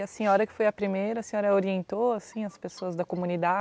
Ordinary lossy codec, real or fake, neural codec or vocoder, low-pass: none; real; none; none